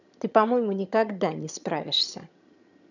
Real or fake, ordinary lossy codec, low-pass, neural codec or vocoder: fake; none; 7.2 kHz; vocoder, 22.05 kHz, 80 mel bands, HiFi-GAN